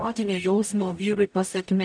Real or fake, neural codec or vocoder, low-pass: fake; codec, 44.1 kHz, 0.9 kbps, DAC; 9.9 kHz